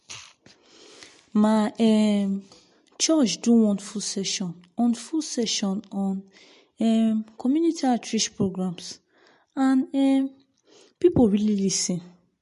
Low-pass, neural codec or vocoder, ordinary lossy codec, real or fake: 14.4 kHz; none; MP3, 48 kbps; real